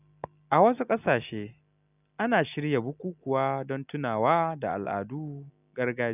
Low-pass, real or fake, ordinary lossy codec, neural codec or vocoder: 3.6 kHz; real; none; none